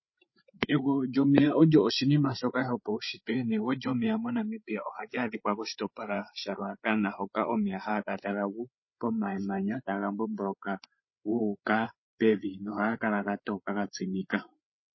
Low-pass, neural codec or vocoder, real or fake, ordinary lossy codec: 7.2 kHz; codec, 16 kHz, 8 kbps, FreqCodec, larger model; fake; MP3, 24 kbps